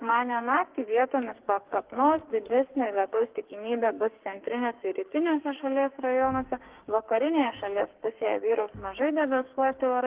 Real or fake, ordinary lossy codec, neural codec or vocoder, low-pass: fake; Opus, 16 kbps; codec, 44.1 kHz, 2.6 kbps, SNAC; 3.6 kHz